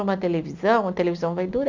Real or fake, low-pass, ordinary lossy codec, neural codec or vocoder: real; 7.2 kHz; none; none